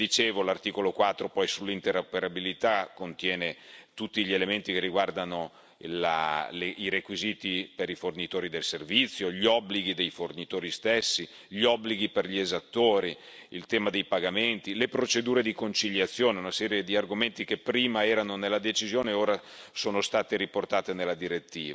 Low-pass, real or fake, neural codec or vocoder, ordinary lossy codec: none; real; none; none